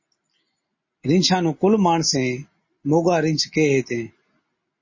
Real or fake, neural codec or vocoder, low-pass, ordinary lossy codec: real; none; 7.2 kHz; MP3, 32 kbps